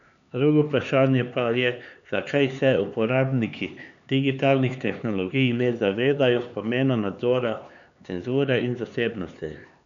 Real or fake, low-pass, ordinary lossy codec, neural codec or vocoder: fake; 7.2 kHz; MP3, 96 kbps; codec, 16 kHz, 4 kbps, X-Codec, HuBERT features, trained on LibriSpeech